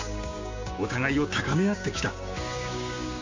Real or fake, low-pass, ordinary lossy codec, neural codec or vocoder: fake; 7.2 kHz; MP3, 48 kbps; codec, 16 kHz, 6 kbps, DAC